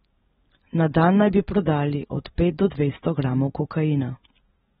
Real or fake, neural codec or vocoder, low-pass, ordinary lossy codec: real; none; 19.8 kHz; AAC, 16 kbps